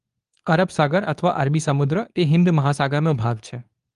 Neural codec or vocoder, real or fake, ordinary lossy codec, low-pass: codec, 24 kHz, 0.9 kbps, WavTokenizer, medium speech release version 2; fake; Opus, 32 kbps; 10.8 kHz